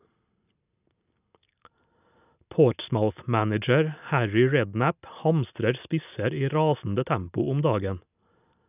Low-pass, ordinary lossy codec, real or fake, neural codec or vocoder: 3.6 kHz; none; real; none